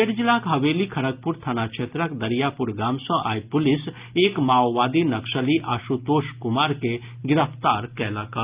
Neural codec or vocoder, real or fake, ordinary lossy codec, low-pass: none; real; Opus, 24 kbps; 3.6 kHz